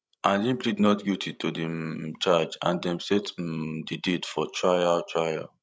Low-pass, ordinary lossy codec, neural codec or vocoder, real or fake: none; none; codec, 16 kHz, 16 kbps, FreqCodec, larger model; fake